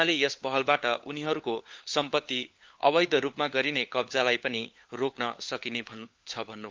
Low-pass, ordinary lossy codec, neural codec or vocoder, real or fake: 7.2 kHz; Opus, 32 kbps; codec, 16 kHz, 4 kbps, FunCodec, trained on LibriTTS, 50 frames a second; fake